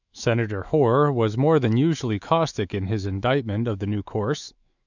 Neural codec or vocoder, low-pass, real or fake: autoencoder, 48 kHz, 128 numbers a frame, DAC-VAE, trained on Japanese speech; 7.2 kHz; fake